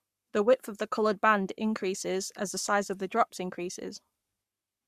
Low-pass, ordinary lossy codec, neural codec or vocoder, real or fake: 14.4 kHz; Opus, 64 kbps; codec, 44.1 kHz, 7.8 kbps, Pupu-Codec; fake